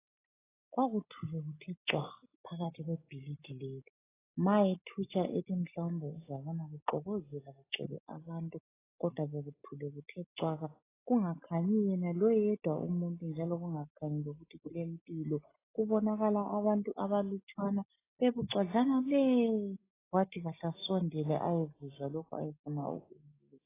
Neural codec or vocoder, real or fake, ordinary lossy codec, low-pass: none; real; AAC, 16 kbps; 3.6 kHz